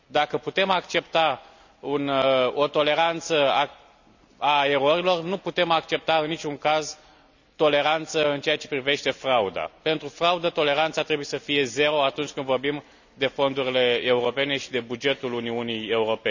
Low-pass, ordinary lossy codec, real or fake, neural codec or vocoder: 7.2 kHz; none; real; none